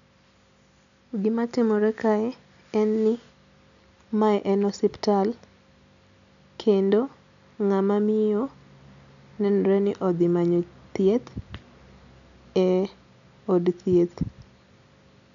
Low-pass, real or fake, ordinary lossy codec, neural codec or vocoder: 7.2 kHz; real; none; none